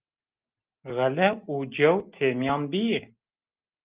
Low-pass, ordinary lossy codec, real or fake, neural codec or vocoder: 3.6 kHz; Opus, 32 kbps; real; none